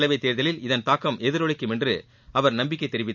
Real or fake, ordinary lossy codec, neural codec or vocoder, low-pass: real; none; none; 7.2 kHz